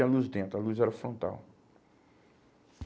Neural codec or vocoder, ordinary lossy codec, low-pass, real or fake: none; none; none; real